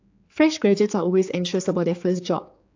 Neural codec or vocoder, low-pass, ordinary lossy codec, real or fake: codec, 16 kHz, 4 kbps, X-Codec, HuBERT features, trained on general audio; 7.2 kHz; AAC, 48 kbps; fake